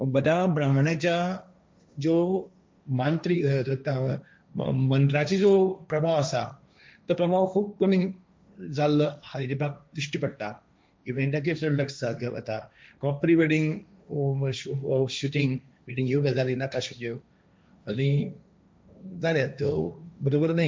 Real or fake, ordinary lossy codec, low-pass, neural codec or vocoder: fake; none; none; codec, 16 kHz, 1.1 kbps, Voila-Tokenizer